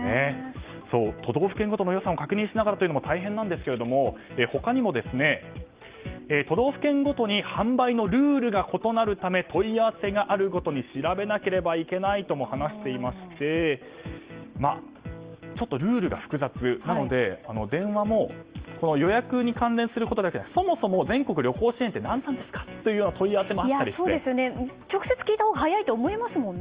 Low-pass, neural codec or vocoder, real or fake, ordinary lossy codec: 3.6 kHz; none; real; Opus, 32 kbps